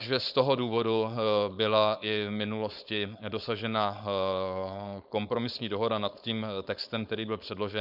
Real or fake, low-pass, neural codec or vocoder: fake; 5.4 kHz; codec, 16 kHz, 4.8 kbps, FACodec